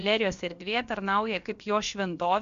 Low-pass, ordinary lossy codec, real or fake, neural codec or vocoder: 7.2 kHz; Opus, 64 kbps; fake; codec, 16 kHz, about 1 kbps, DyCAST, with the encoder's durations